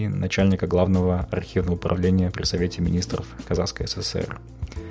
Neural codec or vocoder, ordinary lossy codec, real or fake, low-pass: none; none; real; none